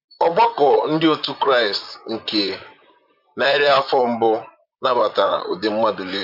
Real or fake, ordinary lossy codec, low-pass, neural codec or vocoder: fake; MP3, 48 kbps; 5.4 kHz; vocoder, 44.1 kHz, 128 mel bands, Pupu-Vocoder